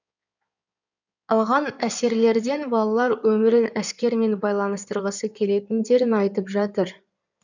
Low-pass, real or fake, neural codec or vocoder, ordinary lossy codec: 7.2 kHz; fake; codec, 16 kHz in and 24 kHz out, 2.2 kbps, FireRedTTS-2 codec; none